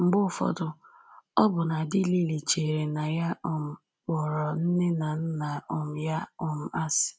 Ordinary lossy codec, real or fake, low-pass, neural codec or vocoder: none; real; none; none